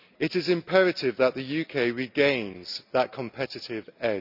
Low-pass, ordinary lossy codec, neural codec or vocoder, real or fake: 5.4 kHz; none; none; real